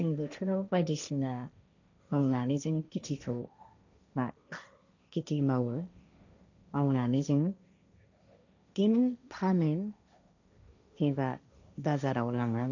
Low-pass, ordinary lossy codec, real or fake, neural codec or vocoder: 7.2 kHz; none; fake; codec, 16 kHz, 1.1 kbps, Voila-Tokenizer